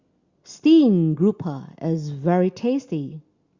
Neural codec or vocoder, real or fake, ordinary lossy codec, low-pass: none; real; Opus, 64 kbps; 7.2 kHz